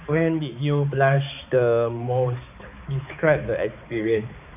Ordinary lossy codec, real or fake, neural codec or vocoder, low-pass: AAC, 32 kbps; fake; codec, 16 kHz, 4 kbps, X-Codec, HuBERT features, trained on balanced general audio; 3.6 kHz